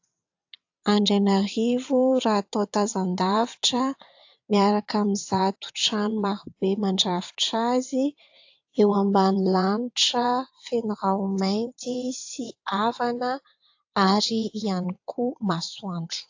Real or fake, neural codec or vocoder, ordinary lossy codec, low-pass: fake; vocoder, 22.05 kHz, 80 mel bands, WaveNeXt; AAC, 48 kbps; 7.2 kHz